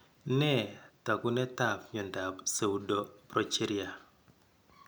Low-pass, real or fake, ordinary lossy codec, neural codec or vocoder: none; real; none; none